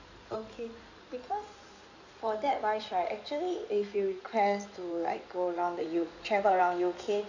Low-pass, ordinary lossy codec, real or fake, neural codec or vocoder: 7.2 kHz; none; fake; codec, 16 kHz in and 24 kHz out, 2.2 kbps, FireRedTTS-2 codec